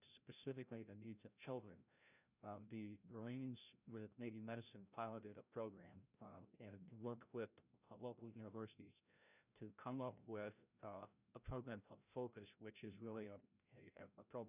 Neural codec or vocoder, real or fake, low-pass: codec, 16 kHz, 0.5 kbps, FreqCodec, larger model; fake; 3.6 kHz